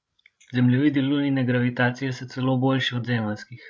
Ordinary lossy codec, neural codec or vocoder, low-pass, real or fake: none; codec, 16 kHz, 16 kbps, FreqCodec, larger model; none; fake